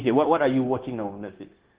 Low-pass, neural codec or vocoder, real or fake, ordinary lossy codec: 3.6 kHz; codec, 16 kHz, 0.9 kbps, LongCat-Audio-Codec; fake; Opus, 16 kbps